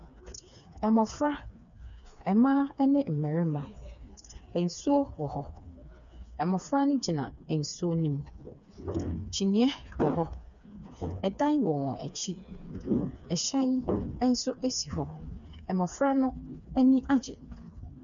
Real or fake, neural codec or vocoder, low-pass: fake; codec, 16 kHz, 4 kbps, FreqCodec, smaller model; 7.2 kHz